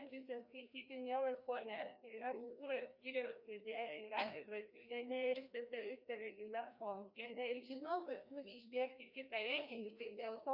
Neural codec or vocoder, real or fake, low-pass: codec, 16 kHz, 1 kbps, FreqCodec, larger model; fake; 5.4 kHz